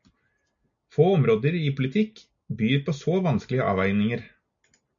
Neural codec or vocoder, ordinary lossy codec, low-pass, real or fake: none; MP3, 96 kbps; 7.2 kHz; real